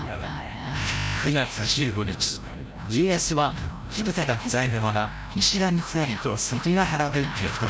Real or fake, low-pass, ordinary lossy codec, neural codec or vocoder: fake; none; none; codec, 16 kHz, 0.5 kbps, FreqCodec, larger model